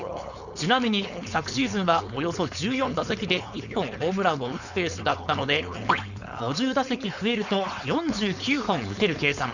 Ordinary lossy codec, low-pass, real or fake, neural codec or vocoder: none; 7.2 kHz; fake; codec, 16 kHz, 4.8 kbps, FACodec